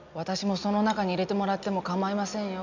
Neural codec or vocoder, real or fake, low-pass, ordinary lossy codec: none; real; 7.2 kHz; none